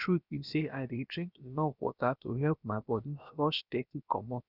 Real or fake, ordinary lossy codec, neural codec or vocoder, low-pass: fake; none; codec, 16 kHz, about 1 kbps, DyCAST, with the encoder's durations; 5.4 kHz